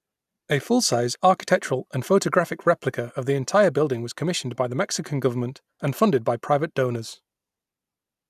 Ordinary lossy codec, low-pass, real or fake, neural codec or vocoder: none; 14.4 kHz; real; none